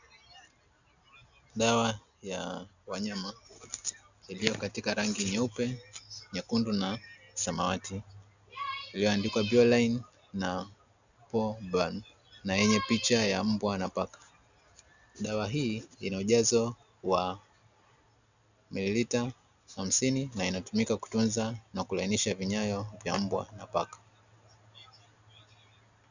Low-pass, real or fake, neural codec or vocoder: 7.2 kHz; real; none